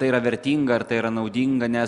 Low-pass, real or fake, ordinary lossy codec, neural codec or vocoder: 10.8 kHz; real; Opus, 64 kbps; none